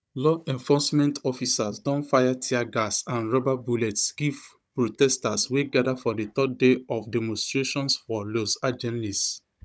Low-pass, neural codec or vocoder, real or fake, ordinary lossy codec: none; codec, 16 kHz, 16 kbps, FunCodec, trained on Chinese and English, 50 frames a second; fake; none